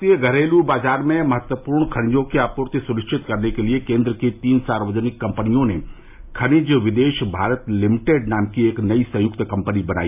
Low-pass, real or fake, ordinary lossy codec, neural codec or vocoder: 3.6 kHz; real; AAC, 32 kbps; none